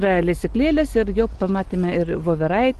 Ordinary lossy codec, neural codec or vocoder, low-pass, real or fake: Opus, 16 kbps; none; 10.8 kHz; real